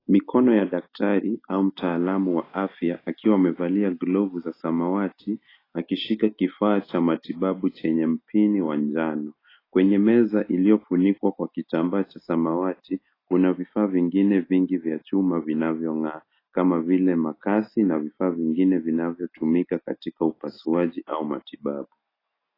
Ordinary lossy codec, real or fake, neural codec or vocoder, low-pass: AAC, 24 kbps; real; none; 5.4 kHz